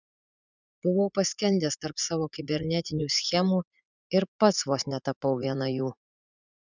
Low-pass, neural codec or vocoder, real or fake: 7.2 kHz; vocoder, 44.1 kHz, 80 mel bands, Vocos; fake